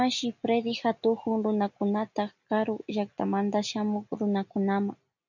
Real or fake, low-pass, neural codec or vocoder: real; 7.2 kHz; none